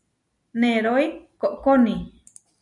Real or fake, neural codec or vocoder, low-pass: real; none; 10.8 kHz